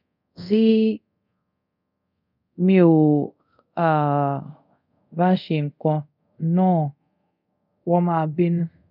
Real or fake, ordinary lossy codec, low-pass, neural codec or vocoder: fake; none; 5.4 kHz; codec, 24 kHz, 0.5 kbps, DualCodec